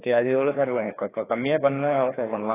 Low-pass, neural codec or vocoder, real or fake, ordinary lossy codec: 3.6 kHz; codec, 16 kHz, 2 kbps, FreqCodec, larger model; fake; AAC, 16 kbps